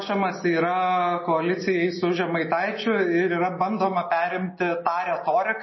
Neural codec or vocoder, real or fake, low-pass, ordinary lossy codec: none; real; 7.2 kHz; MP3, 24 kbps